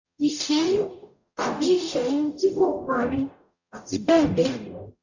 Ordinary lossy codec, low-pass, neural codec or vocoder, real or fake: none; 7.2 kHz; codec, 44.1 kHz, 0.9 kbps, DAC; fake